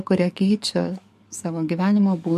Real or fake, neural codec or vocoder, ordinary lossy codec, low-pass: fake; codec, 44.1 kHz, 7.8 kbps, DAC; MP3, 64 kbps; 14.4 kHz